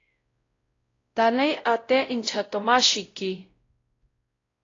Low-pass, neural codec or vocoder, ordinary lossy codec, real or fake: 7.2 kHz; codec, 16 kHz, 0.5 kbps, X-Codec, WavLM features, trained on Multilingual LibriSpeech; AAC, 32 kbps; fake